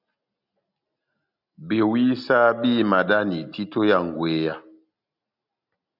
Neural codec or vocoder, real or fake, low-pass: none; real; 5.4 kHz